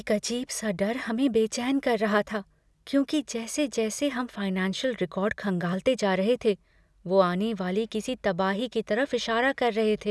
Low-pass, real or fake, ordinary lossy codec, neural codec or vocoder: none; real; none; none